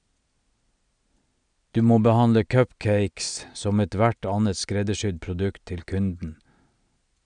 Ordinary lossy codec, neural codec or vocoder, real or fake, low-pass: none; none; real; 9.9 kHz